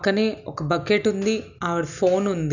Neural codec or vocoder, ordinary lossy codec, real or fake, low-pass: none; none; real; 7.2 kHz